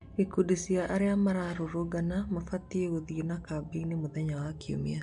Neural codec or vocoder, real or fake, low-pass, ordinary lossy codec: none; real; 10.8 kHz; MP3, 64 kbps